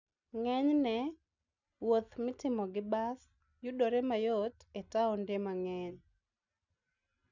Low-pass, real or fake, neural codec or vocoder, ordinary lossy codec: 7.2 kHz; real; none; none